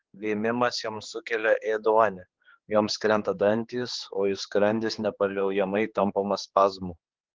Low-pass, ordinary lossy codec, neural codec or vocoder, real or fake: 7.2 kHz; Opus, 16 kbps; codec, 16 kHz, 4 kbps, X-Codec, HuBERT features, trained on general audio; fake